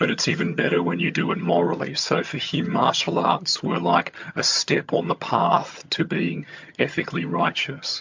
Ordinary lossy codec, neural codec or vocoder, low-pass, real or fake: MP3, 48 kbps; vocoder, 22.05 kHz, 80 mel bands, HiFi-GAN; 7.2 kHz; fake